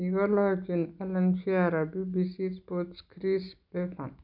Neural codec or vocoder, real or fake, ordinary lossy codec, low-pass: none; real; none; 5.4 kHz